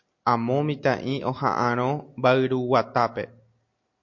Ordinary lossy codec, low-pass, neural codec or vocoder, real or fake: MP3, 48 kbps; 7.2 kHz; none; real